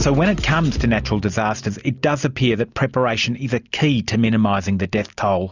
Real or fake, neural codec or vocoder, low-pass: real; none; 7.2 kHz